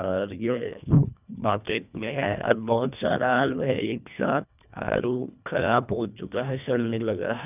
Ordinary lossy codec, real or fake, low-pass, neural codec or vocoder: none; fake; 3.6 kHz; codec, 24 kHz, 1.5 kbps, HILCodec